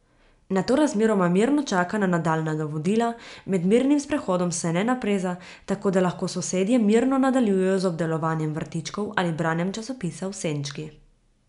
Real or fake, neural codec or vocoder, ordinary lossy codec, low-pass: real; none; none; 10.8 kHz